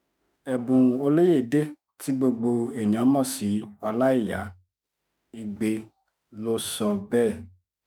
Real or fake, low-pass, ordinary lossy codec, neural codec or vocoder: fake; none; none; autoencoder, 48 kHz, 32 numbers a frame, DAC-VAE, trained on Japanese speech